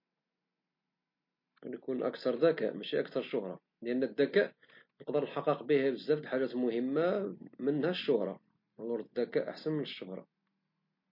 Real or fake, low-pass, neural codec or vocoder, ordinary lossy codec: real; 5.4 kHz; none; MP3, 32 kbps